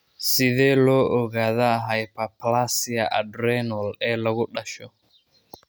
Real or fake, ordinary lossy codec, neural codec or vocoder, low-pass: real; none; none; none